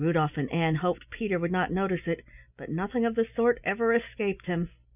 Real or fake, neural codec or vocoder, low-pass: real; none; 3.6 kHz